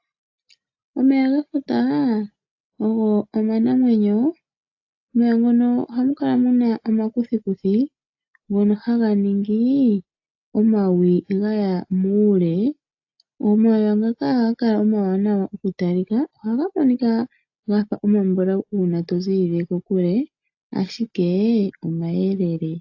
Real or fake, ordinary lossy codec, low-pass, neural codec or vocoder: real; AAC, 48 kbps; 7.2 kHz; none